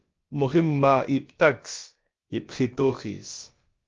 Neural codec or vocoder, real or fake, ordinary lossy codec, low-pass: codec, 16 kHz, about 1 kbps, DyCAST, with the encoder's durations; fake; Opus, 32 kbps; 7.2 kHz